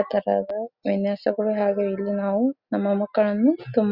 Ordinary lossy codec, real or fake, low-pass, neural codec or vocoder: Opus, 64 kbps; real; 5.4 kHz; none